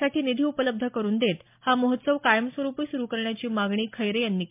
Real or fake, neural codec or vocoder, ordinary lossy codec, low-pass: real; none; MP3, 32 kbps; 3.6 kHz